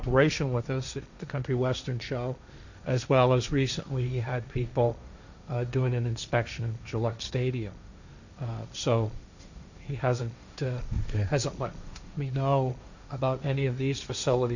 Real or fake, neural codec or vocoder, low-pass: fake; codec, 16 kHz, 1.1 kbps, Voila-Tokenizer; 7.2 kHz